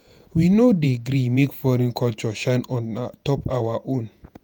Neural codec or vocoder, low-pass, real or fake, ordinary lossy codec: vocoder, 48 kHz, 128 mel bands, Vocos; none; fake; none